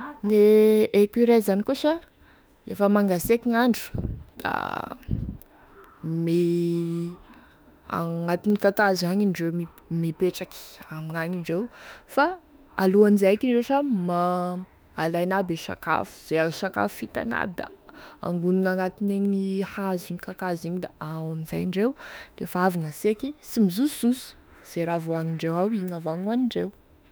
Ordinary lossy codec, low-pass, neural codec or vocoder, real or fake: none; none; autoencoder, 48 kHz, 32 numbers a frame, DAC-VAE, trained on Japanese speech; fake